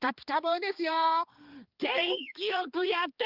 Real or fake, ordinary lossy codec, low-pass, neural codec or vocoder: fake; Opus, 24 kbps; 5.4 kHz; codec, 16 kHz, 2 kbps, X-Codec, HuBERT features, trained on balanced general audio